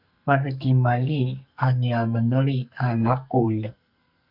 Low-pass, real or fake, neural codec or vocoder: 5.4 kHz; fake; codec, 44.1 kHz, 2.6 kbps, SNAC